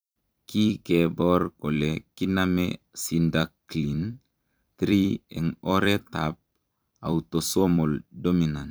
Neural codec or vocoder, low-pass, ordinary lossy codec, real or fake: vocoder, 44.1 kHz, 128 mel bands every 256 samples, BigVGAN v2; none; none; fake